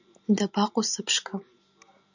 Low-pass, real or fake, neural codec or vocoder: 7.2 kHz; real; none